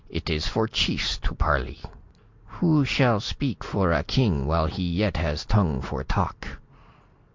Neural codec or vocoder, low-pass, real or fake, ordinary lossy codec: none; 7.2 kHz; real; MP3, 64 kbps